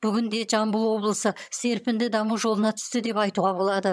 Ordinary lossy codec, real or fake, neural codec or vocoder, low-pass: none; fake; vocoder, 22.05 kHz, 80 mel bands, HiFi-GAN; none